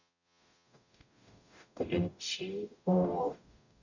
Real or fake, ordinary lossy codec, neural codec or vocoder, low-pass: fake; none; codec, 44.1 kHz, 0.9 kbps, DAC; 7.2 kHz